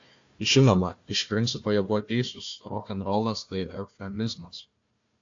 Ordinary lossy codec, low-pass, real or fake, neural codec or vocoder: AAC, 48 kbps; 7.2 kHz; fake; codec, 16 kHz, 1 kbps, FunCodec, trained on Chinese and English, 50 frames a second